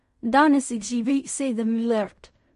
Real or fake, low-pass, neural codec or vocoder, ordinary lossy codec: fake; 10.8 kHz; codec, 16 kHz in and 24 kHz out, 0.4 kbps, LongCat-Audio-Codec, fine tuned four codebook decoder; MP3, 48 kbps